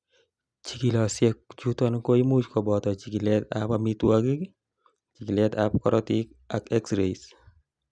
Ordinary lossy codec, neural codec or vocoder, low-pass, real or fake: none; none; 9.9 kHz; real